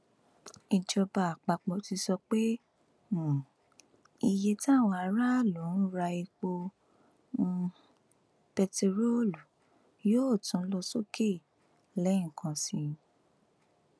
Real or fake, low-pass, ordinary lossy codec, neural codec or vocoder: real; none; none; none